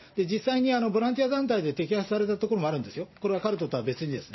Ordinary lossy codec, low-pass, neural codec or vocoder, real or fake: MP3, 24 kbps; 7.2 kHz; none; real